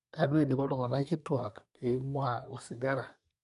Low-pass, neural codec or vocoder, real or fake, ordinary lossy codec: 10.8 kHz; codec, 24 kHz, 1 kbps, SNAC; fake; none